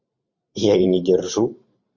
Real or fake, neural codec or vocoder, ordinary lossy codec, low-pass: fake; vocoder, 44.1 kHz, 128 mel bands every 512 samples, BigVGAN v2; Opus, 64 kbps; 7.2 kHz